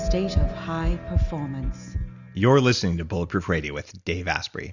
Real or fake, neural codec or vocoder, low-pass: real; none; 7.2 kHz